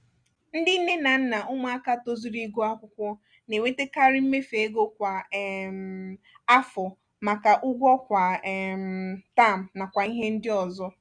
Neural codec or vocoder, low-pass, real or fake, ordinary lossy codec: none; 9.9 kHz; real; none